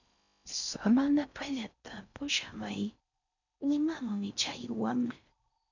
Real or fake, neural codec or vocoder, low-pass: fake; codec, 16 kHz in and 24 kHz out, 0.6 kbps, FocalCodec, streaming, 4096 codes; 7.2 kHz